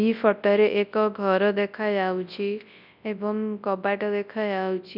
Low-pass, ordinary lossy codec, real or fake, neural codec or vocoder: 5.4 kHz; none; fake; codec, 24 kHz, 0.9 kbps, WavTokenizer, large speech release